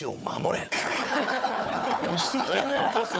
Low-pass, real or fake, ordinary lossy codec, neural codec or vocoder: none; fake; none; codec, 16 kHz, 4 kbps, FunCodec, trained on Chinese and English, 50 frames a second